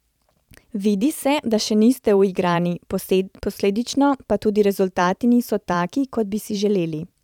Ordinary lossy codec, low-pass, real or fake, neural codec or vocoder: none; 19.8 kHz; fake; vocoder, 44.1 kHz, 128 mel bands every 512 samples, BigVGAN v2